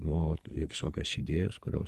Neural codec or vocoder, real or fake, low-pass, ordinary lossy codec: codec, 44.1 kHz, 2.6 kbps, SNAC; fake; 14.4 kHz; Opus, 32 kbps